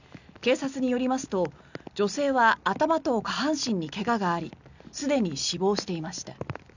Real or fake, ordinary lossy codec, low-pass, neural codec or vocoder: real; none; 7.2 kHz; none